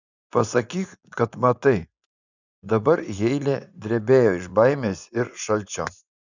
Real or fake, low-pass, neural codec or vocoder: fake; 7.2 kHz; vocoder, 24 kHz, 100 mel bands, Vocos